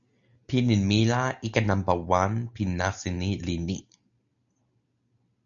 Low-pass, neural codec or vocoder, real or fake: 7.2 kHz; none; real